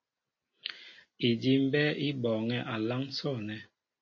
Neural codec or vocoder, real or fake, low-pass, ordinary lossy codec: none; real; 7.2 kHz; MP3, 32 kbps